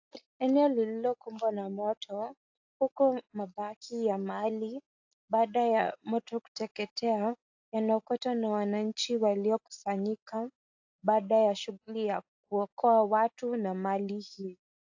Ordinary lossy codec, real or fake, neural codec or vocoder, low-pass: AAC, 48 kbps; real; none; 7.2 kHz